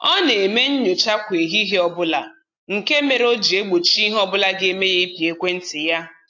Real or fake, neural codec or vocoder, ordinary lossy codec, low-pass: real; none; AAC, 48 kbps; 7.2 kHz